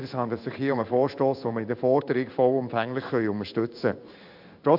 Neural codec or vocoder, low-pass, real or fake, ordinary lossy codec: codec, 16 kHz in and 24 kHz out, 1 kbps, XY-Tokenizer; 5.4 kHz; fake; AAC, 48 kbps